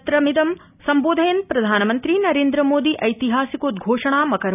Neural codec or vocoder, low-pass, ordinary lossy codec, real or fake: none; 3.6 kHz; none; real